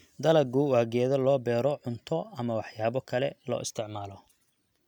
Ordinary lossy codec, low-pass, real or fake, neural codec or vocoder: none; 19.8 kHz; real; none